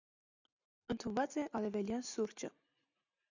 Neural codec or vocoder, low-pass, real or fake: none; 7.2 kHz; real